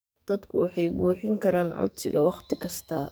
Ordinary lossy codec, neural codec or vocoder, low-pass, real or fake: none; codec, 44.1 kHz, 2.6 kbps, SNAC; none; fake